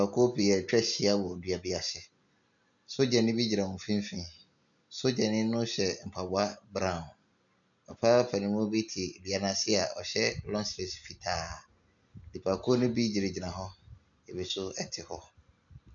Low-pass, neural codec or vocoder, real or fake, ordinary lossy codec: 7.2 kHz; none; real; MP3, 96 kbps